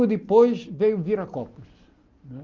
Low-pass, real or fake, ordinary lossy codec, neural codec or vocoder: 7.2 kHz; real; Opus, 32 kbps; none